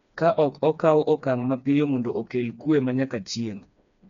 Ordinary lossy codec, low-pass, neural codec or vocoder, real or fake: none; 7.2 kHz; codec, 16 kHz, 2 kbps, FreqCodec, smaller model; fake